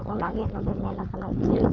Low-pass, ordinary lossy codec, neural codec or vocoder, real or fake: none; none; codec, 16 kHz, 4.8 kbps, FACodec; fake